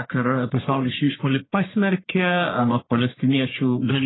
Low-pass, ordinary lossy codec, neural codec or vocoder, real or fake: 7.2 kHz; AAC, 16 kbps; codec, 44.1 kHz, 1.7 kbps, Pupu-Codec; fake